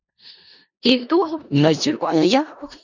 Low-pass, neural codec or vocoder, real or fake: 7.2 kHz; codec, 16 kHz in and 24 kHz out, 0.4 kbps, LongCat-Audio-Codec, four codebook decoder; fake